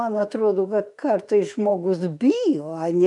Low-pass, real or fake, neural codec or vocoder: 10.8 kHz; fake; autoencoder, 48 kHz, 32 numbers a frame, DAC-VAE, trained on Japanese speech